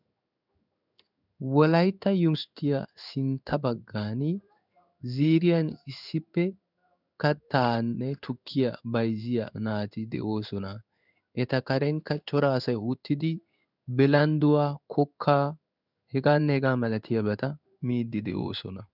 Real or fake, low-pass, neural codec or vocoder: fake; 5.4 kHz; codec, 16 kHz in and 24 kHz out, 1 kbps, XY-Tokenizer